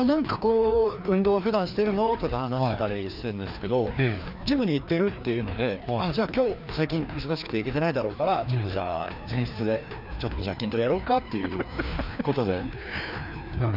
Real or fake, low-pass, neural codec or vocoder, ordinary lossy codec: fake; 5.4 kHz; codec, 16 kHz, 2 kbps, FreqCodec, larger model; none